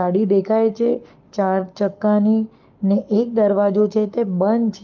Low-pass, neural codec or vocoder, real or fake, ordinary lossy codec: 7.2 kHz; codec, 44.1 kHz, 7.8 kbps, Pupu-Codec; fake; Opus, 32 kbps